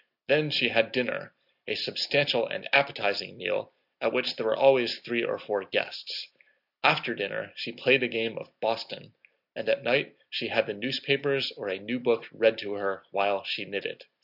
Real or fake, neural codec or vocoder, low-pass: real; none; 5.4 kHz